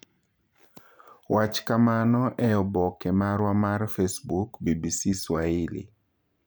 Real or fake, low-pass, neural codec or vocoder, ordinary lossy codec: fake; none; vocoder, 44.1 kHz, 128 mel bands every 512 samples, BigVGAN v2; none